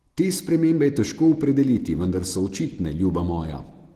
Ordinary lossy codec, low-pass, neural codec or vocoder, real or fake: Opus, 16 kbps; 14.4 kHz; none; real